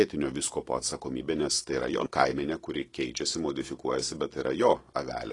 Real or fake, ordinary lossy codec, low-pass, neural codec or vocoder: real; AAC, 32 kbps; 10.8 kHz; none